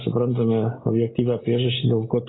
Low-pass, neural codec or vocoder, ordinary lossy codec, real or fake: 7.2 kHz; none; AAC, 16 kbps; real